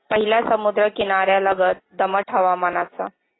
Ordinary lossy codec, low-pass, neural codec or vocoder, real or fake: AAC, 16 kbps; 7.2 kHz; none; real